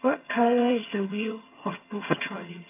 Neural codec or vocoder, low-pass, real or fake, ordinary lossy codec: vocoder, 22.05 kHz, 80 mel bands, HiFi-GAN; 3.6 kHz; fake; AAC, 24 kbps